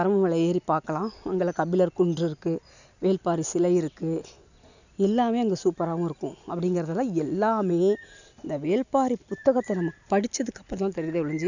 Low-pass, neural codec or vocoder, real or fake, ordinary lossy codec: 7.2 kHz; none; real; none